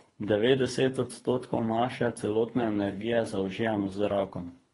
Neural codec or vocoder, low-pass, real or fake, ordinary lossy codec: codec, 24 kHz, 3 kbps, HILCodec; 10.8 kHz; fake; AAC, 32 kbps